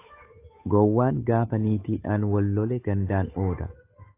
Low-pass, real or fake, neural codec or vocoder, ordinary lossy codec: 3.6 kHz; fake; codec, 16 kHz, 8 kbps, FunCodec, trained on Chinese and English, 25 frames a second; AAC, 24 kbps